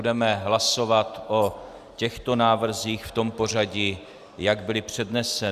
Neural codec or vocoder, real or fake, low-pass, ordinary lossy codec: none; real; 14.4 kHz; Opus, 64 kbps